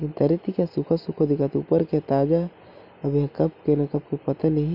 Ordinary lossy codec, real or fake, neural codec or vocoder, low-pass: none; real; none; 5.4 kHz